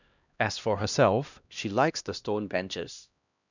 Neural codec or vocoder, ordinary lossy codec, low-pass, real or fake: codec, 16 kHz, 1 kbps, X-Codec, HuBERT features, trained on LibriSpeech; none; 7.2 kHz; fake